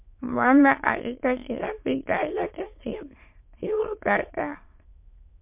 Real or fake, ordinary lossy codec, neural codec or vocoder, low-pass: fake; MP3, 32 kbps; autoencoder, 22.05 kHz, a latent of 192 numbers a frame, VITS, trained on many speakers; 3.6 kHz